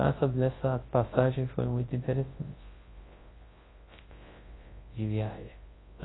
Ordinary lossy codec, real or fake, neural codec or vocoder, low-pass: AAC, 16 kbps; fake; codec, 24 kHz, 0.9 kbps, WavTokenizer, large speech release; 7.2 kHz